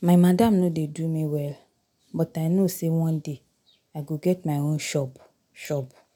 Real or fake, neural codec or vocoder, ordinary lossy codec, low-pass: real; none; none; 19.8 kHz